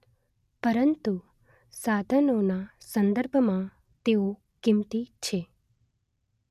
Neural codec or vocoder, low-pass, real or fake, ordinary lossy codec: none; 14.4 kHz; real; none